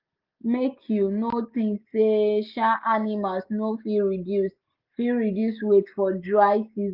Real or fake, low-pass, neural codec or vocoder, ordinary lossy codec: real; 5.4 kHz; none; Opus, 24 kbps